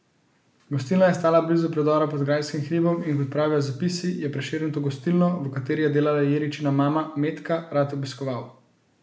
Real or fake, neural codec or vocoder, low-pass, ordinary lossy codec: real; none; none; none